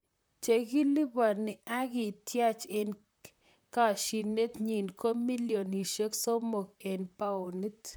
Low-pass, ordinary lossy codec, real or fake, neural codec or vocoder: none; none; fake; vocoder, 44.1 kHz, 128 mel bands, Pupu-Vocoder